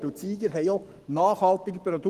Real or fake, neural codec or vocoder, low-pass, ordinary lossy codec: fake; codec, 44.1 kHz, 7.8 kbps, Pupu-Codec; 14.4 kHz; Opus, 24 kbps